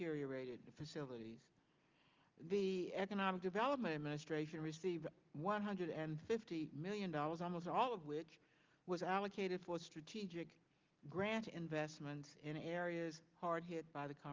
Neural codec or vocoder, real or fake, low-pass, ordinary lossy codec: none; real; 7.2 kHz; Opus, 32 kbps